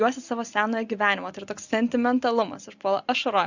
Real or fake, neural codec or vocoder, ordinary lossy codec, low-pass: real; none; Opus, 64 kbps; 7.2 kHz